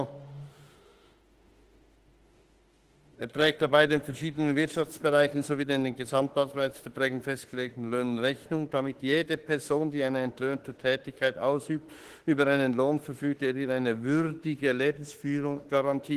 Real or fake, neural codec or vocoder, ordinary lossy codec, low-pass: fake; autoencoder, 48 kHz, 32 numbers a frame, DAC-VAE, trained on Japanese speech; Opus, 16 kbps; 14.4 kHz